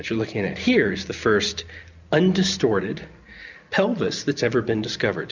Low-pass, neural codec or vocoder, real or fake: 7.2 kHz; none; real